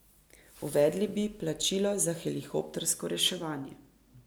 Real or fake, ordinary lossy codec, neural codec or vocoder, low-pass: real; none; none; none